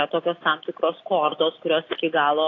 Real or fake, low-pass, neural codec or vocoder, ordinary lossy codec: real; 7.2 kHz; none; AAC, 32 kbps